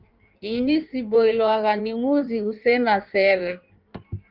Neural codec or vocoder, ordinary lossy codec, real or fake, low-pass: codec, 16 kHz in and 24 kHz out, 1.1 kbps, FireRedTTS-2 codec; Opus, 24 kbps; fake; 5.4 kHz